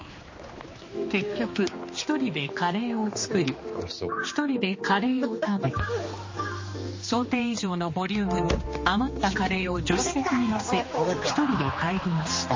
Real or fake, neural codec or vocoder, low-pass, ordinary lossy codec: fake; codec, 16 kHz, 2 kbps, X-Codec, HuBERT features, trained on general audio; 7.2 kHz; MP3, 32 kbps